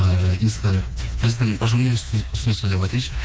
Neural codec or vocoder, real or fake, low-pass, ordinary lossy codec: codec, 16 kHz, 2 kbps, FreqCodec, smaller model; fake; none; none